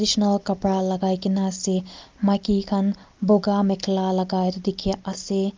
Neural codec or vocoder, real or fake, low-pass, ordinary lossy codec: none; real; 7.2 kHz; Opus, 24 kbps